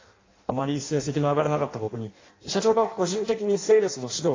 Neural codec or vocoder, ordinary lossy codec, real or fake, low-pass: codec, 16 kHz in and 24 kHz out, 0.6 kbps, FireRedTTS-2 codec; AAC, 32 kbps; fake; 7.2 kHz